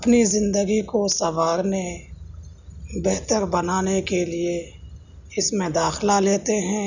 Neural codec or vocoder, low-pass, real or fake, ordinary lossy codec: none; 7.2 kHz; real; none